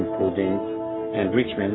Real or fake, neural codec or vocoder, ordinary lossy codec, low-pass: fake; codec, 44.1 kHz, 3.4 kbps, Pupu-Codec; AAC, 16 kbps; 7.2 kHz